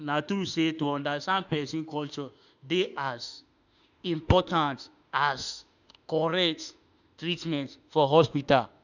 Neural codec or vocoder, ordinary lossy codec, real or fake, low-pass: autoencoder, 48 kHz, 32 numbers a frame, DAC-VAE, trained on Japanese speech; none; fake; 7.2 kHz